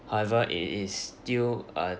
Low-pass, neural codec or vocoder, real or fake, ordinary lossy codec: none; none; real; none